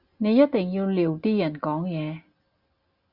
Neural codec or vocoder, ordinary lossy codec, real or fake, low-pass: none; Opus, 64 kbps; real; 5.4 kHz